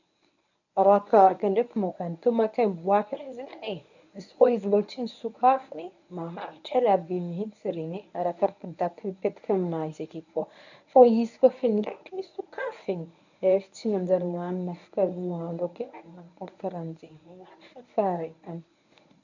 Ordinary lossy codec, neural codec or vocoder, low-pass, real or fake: none; codec, 24 kHz, 0.9 kbps, WavTokenizer, medium speech release version 1; 7.2 kHz; fake